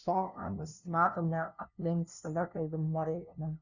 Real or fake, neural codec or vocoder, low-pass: fake; codec, 16 kHz, 0.5 kbps, FunCodec, trained on LibriTTS, 25 frames a second; 7.2 kHz